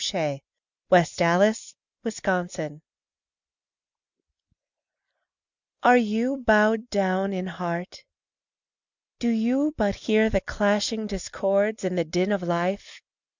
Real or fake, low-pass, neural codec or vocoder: real; 7.2 kHz; none